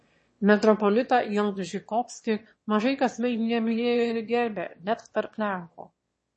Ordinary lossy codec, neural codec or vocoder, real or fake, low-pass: MP3, 32 kbps; autoencoder, 22.05 kHz, a latent of 192 numbers a frame, VITS, trained on one speaker; fake; 9.9 kHz